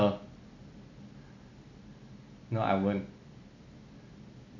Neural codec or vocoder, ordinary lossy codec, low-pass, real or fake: none; none; 7.2 kHz; real